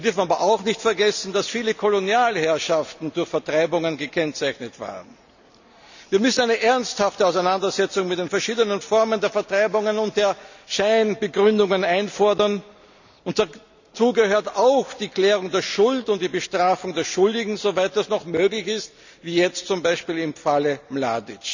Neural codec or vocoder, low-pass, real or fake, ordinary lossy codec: none; 7.2 kHz; real; none